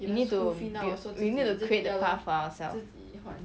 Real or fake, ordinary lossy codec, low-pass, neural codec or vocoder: real; none; none; none